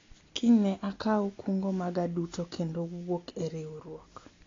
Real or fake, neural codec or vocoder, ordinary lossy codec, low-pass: real; none; AAC, 32 kbps; 7.2 kHz